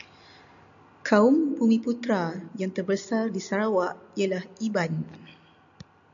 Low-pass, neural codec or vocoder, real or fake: 7.2 kHz; none; real